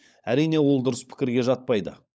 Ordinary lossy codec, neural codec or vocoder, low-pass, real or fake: none; codec, 16 kHz, 16 kbps, FunCodec, trained on LibriTTS, 50 frames a second; none; fake